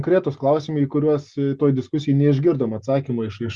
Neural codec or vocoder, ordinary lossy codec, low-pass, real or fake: none; Opus, 64 kbps; 10.8 kHz; real